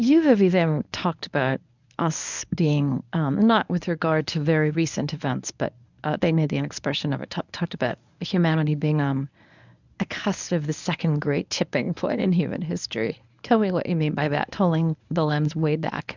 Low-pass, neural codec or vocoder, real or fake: 7.2 kHz; codec, 24 kHz, 0.9 kbps, WavTokenizer, medium speech release version 1; fake